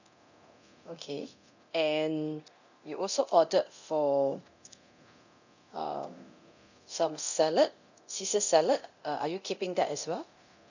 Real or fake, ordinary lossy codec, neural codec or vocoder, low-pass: fake; none; codec, 24 kHz, 0.9 kbps, DualCodec; 7.2 kHz